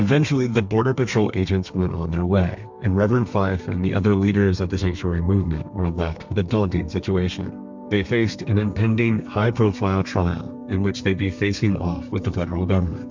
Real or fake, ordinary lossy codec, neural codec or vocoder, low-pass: fake; MP3, 64 kbps; codec, 32 kHz, 1.9 kbps, SNAC; 7.2 kHz